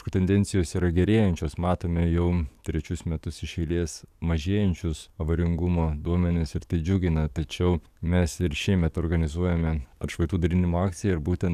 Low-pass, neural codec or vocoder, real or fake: 14.4 kHz; codec, 44.1 kHz, 7.8 kbps, DAC; fake